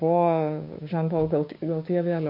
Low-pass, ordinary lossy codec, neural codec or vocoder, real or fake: 5.4 kHz; MP3, 32 kbps; codec, 16 kHz, 6 kbps, DAC; fake